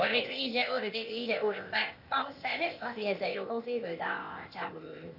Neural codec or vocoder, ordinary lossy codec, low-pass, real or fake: codec, 16 kHz, 0.8 kbps, ZipCodec; none; 5.4 kHz; fake